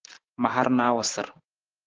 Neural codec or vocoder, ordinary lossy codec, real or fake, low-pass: none; Opus, 16 kbps; real; 7.2 kHz